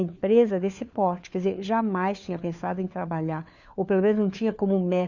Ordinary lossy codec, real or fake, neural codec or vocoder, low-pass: none; fake; codec, 16 kHz, 4 kbps, FunCodec, trained on LibriTTS, 50 frames a second; 7.2 kHz